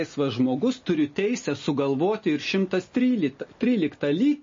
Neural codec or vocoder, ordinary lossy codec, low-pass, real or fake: none; MP3, 32 kbps; 7.2 kHz; real